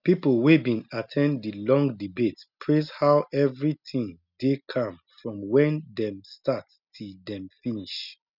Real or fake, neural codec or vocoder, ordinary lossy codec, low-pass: real; none; none; 5.4 kHz